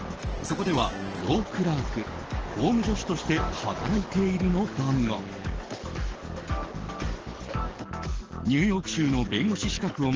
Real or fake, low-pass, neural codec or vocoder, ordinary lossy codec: fake; 7.2 kHz; codec, 44.1 kHz, 7.8 kbps, DAC; Opus, 16 kbps